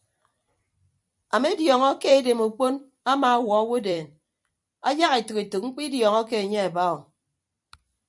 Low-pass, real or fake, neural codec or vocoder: 10.8 kHz; fake; vocoder, 44.1 kHz, 128 mel bands every 256 samples, BigVGAN v2